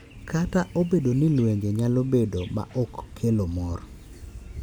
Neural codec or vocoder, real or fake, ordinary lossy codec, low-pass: none; real; none; none